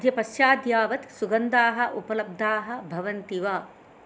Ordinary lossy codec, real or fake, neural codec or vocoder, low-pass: none; real; none; none